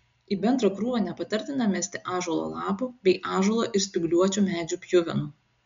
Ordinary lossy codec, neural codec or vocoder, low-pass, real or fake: MP3, 64 kbps; none; 7.2 kHz; real